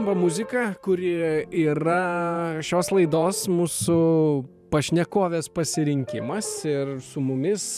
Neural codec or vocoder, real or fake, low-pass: vocoder, 44.1 kHz, 128 mel bands every 512 samples, BigVGAN v2; fake; 14.4 kHz